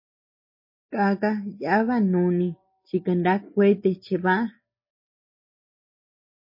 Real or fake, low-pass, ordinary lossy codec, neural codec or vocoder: real; 5.4 kHz; MP3, 24 kbps; none